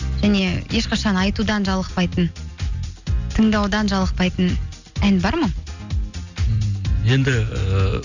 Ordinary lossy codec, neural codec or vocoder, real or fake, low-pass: none; none; real; 7.2 kHz